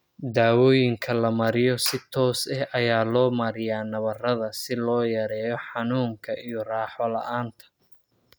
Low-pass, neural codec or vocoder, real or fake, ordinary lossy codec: none; none; real; none